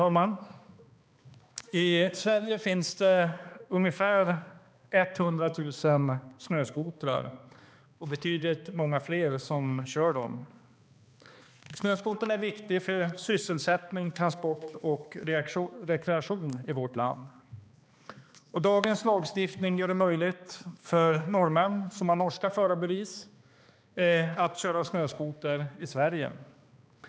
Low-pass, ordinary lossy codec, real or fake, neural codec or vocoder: none; none; fake; codec, 16 kHz, 2 kbps, X-Codec, HuBERT features, trained on balanced general audio